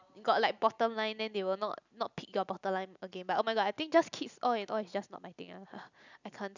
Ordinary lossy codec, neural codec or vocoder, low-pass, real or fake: none; none; 7.2 kHz; real